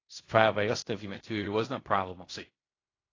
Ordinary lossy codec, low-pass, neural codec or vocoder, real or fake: AAC, 32 kbps; 7.2 kHz; codec, 16 kHz in and 24 kHz out, 0.4 kbps, LongCat-Audio-Codec, fine tuned four codebook decoder; fake